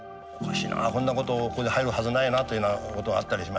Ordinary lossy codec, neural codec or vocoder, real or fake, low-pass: none; none; real; none